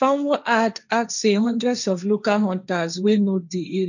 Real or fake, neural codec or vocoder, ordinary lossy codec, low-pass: fake; codec, 16 kHz, 1.1 kbps, Voila-Tokenizer; none; 7.2 kHz